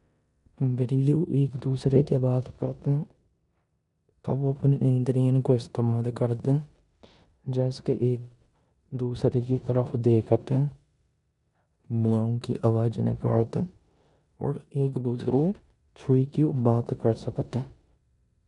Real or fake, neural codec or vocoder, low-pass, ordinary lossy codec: fake; codec, 16 kHz in and 24 kHz out, 0.9 kbps, LongCat-Audio-Codec, four codebook decoder; 10.8 kHz; none